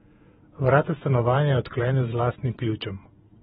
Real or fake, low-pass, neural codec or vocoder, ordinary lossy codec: fake; 19.8 kHz; vocoder, 48 kHz, 128 mel bands, Vocos; AAC, 16 kbps